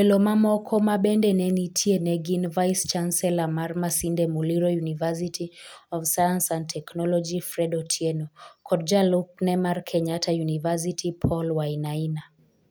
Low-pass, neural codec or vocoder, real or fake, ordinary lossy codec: none; none; real; none